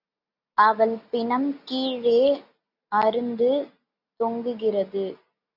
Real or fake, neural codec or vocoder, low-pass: real; none; 5.4 kHz